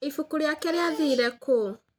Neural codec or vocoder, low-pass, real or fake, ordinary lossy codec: none; none; real; none